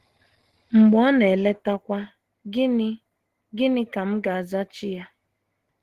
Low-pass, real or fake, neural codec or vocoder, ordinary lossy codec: 14.4 kHz; real; none; Opus, 16 kbps